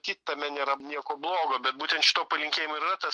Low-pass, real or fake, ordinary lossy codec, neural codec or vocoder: 9.9 kHz; real; MP3, 64 kbps; none